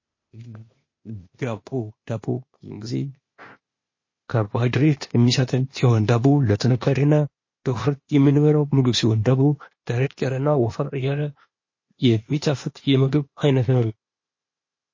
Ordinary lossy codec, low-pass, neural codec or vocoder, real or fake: MP3, 32 kbps; 7.2 kHz; codec, 16 kHz, 0.8 kbps, ZipCodec; fake